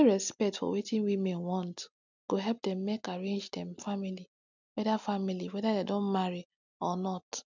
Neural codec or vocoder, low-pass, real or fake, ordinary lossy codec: none; 7.2 kHz; real; none